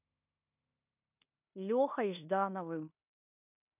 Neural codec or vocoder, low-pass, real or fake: codec, 16 kHz in and 24 kHz out, 0.9 kbps, LongCat-Audio-Codec, fine tuned four codebook decoder; 3.6 kHz; fake